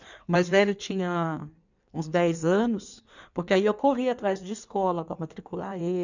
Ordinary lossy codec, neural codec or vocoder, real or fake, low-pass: none; codec, 16 kHz in and 24 kHz out, 1.1 kbps, FireRedTTS-2 codec; fake; 7.2 kHz